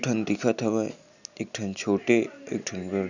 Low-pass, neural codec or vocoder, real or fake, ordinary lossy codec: 7.2 kHz; none; real; none